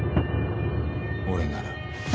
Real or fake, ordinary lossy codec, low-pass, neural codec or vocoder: real; none; none; none